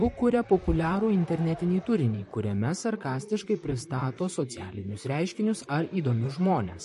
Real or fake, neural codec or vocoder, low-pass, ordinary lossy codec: fake; vocoder, 44.1 kHz, 128 mel bands, Pupu-Vocoder; 14.4 kHz; MP3, 48 kbps